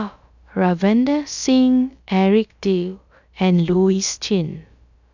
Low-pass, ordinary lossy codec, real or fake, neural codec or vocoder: 7.2 kHz; none; fake; codec, 16 kHz, about 1 kbps, DyCAST, with the encoder's durations